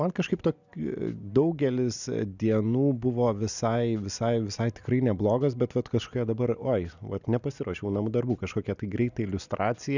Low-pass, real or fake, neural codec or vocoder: 7.2 kHz; real; none